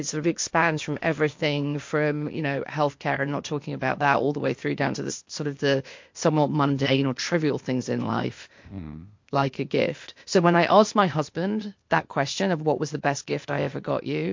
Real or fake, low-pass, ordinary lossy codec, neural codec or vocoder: fake; 7.2 kHz; MP3, 48 kbps; codec, 16 kHz, 0.8 kbps, ZipCodec